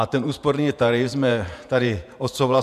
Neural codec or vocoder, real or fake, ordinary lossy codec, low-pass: vocoder, 48 kHz, 128 mel bands, Vocos; fake; MP3, 96 kbps; 14.4 kHz